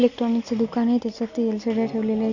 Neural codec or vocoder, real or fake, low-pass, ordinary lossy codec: none; real; 7.2 kHz; none